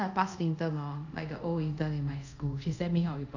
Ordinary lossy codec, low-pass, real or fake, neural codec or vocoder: none; 7.2 kHz; fake; codec, 24 kHz, 0.5 kbps, DualCodec